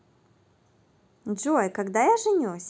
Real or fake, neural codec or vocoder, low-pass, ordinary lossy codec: real; none; none; none